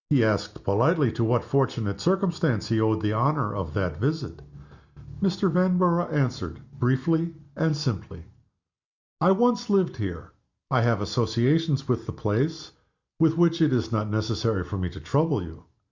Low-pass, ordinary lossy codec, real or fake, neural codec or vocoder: 7.2 kHz; Opus, 64 kbps; real; none